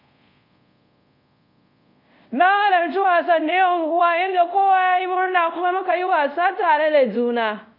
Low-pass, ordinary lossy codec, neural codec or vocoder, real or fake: 5.4 kHz; MP3, 48 kbps; codec, 24 kHz, 0.5 kbps, DualCodec; fake